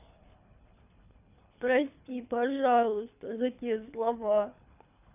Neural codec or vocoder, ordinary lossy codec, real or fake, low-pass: codec, 24 kHz, 3 kbps, HILCodec; none; fake; 3.6 kHz